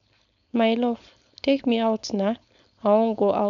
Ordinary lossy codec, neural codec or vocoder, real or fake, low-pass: none; codec, 16 kHz, 4.8 kbps, FACodec; fake; 7.2 kHz